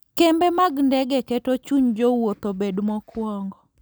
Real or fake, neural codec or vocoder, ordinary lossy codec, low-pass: real; none; none; none